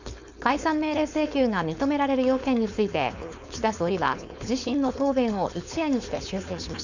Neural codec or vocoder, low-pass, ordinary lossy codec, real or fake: codec, 16 kHz, 4.8 kbps, FACodec; 7.2 kHz; none; fake